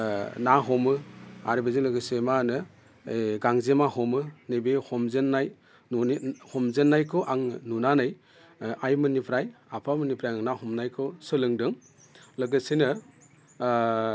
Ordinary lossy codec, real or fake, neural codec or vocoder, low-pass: none; real; none; none